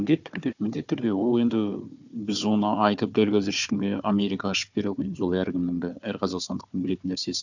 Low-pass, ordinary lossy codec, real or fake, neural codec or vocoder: 7.2 kHz; none; fake; codec, 16 kHz, 4 kbps, FunCodec, trained on Chinese and English, 50 frames a second